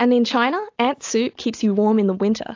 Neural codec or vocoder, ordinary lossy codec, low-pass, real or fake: none; AAC, 48 kbps; 7.2 kHz; real